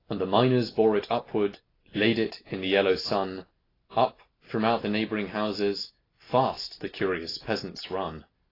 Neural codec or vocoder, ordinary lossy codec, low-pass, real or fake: none; AAC, 24 kbps; 5.4 kHz; real